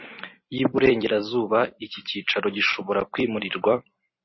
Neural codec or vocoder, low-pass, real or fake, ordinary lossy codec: vocoder, 44.1 kHz, 128 mel bands every 256 samples, BigVGAN v2; 7.2 kHz; fake; MP3, 24 kbps